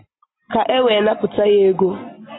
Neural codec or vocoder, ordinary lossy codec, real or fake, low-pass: none; AAC, 16 kbps; real; 7.2 kHz